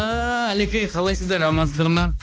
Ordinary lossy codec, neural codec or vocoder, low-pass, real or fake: none; codec, 16 kHz, 1 kbps, X-Codec, HuBERT features, trained on balanced general audio; none; fake